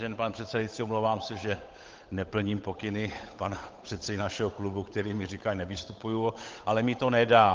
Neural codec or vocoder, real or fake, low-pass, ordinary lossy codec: codec, 16 kHz, 8 kbps, FunCodec, trained on Chinese and English, 25 frames a second; fake; 7.2 kHz; Opus, 24 kbps